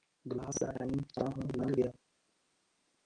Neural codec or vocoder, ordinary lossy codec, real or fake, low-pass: none; Opus, 32 kbps; real; 9.9 kHz